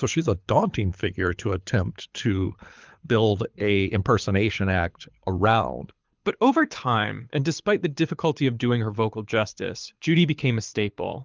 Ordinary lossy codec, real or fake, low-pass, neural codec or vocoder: Opus, 24 kbps; fake; 7.2 kHz; codec, 24 kHz, 6 kbps, HILCodec